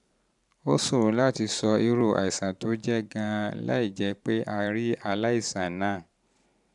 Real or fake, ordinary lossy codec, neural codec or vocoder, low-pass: fake; none; vocoder, 44.1 kHz, 128 mel bands every 512 samples, BigVGAN v2; 10.8 kHz